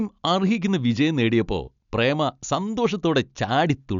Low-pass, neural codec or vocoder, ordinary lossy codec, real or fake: 7.2 kHz; none; none; real